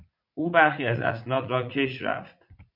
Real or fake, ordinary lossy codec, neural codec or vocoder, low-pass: fake; MP3, 48 kbps; vocoder, 44.1 kHz, 80 mel bands, Vocos; 5.4 kHz